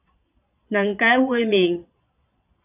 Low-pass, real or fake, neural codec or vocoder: 3.6 kHz; fake; vocoder, 22.05 kHz, 80 mel bands, Vocos